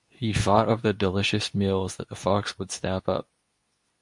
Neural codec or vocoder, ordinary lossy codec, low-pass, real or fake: codec, 24 kHz, 0.9 kbps, WavTokenizer, medium speech release version 2; MP3, 64 kbps; 10.8 kHz; fake